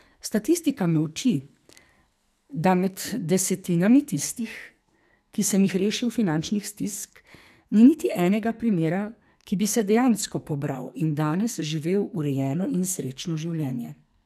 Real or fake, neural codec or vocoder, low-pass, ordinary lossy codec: fake; codec, 44.1 kHz, 2.6 kbps, SNAC; 14.4 kHz; none